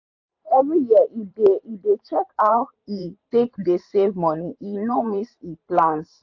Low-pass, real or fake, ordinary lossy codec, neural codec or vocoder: 7.2 kHz; fake; AAC, 48 kbps; vocoder, 44.1 kHz, 128 mel bands every 512 samples, BigVGAN v2